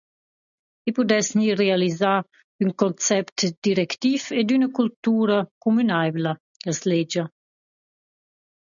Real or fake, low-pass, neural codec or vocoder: real; 7.2 kHz; none